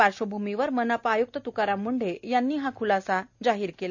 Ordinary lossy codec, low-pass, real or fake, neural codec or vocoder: none; 7.2 kHz; real; none